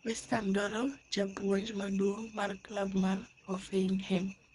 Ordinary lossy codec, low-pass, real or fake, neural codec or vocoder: Opus, 64 kbps; 10.8 kHz; fake; codec, 24 kHz, 3 kbps, HILCodec